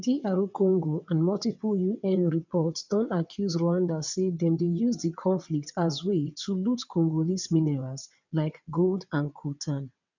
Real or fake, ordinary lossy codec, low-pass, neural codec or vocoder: fake; none; 7.2 kHz; vocoder, 22.05 kHz, 80 mel bands, WaveNeXt